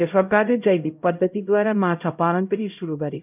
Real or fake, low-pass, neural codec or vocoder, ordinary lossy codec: fake; 3.6 kHz; codec, 16 kHz, 0.5 kbps, X-Codec, HuBERT features, trained on LibriSpeech; none